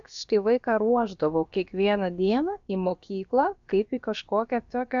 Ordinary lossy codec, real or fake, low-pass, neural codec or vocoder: MP3, 96 kbps; fake; 7.2 kHz; codec, 16 kHz, about 1 kbps, DyCAST, with the encoder's durations